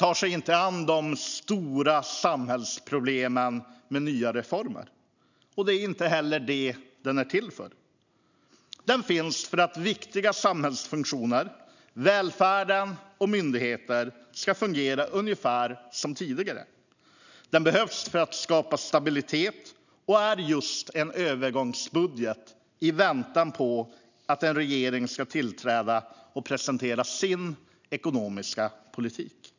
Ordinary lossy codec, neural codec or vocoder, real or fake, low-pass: none; none; real; 7.2 kHz